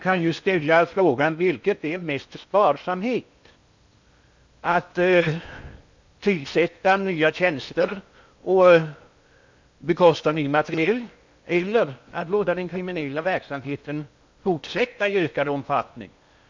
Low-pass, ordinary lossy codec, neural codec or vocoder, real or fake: 7.2 kHz; MP3, 64 kbps; codec, 16 kHz in and 24 kHz out, 0.8 kbps, FocalCodec, streaming, 65536 codes; fake